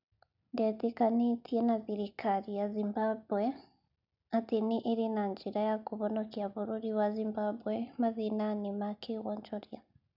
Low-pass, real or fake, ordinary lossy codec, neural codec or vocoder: 5.4 kHz; real; none; none